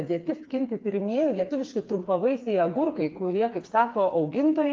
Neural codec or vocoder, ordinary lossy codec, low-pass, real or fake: codec, 16 kHz, 4 kbps, FreqCodec, smaller model; Opus, 32 kbps; 7.2 kHz; fake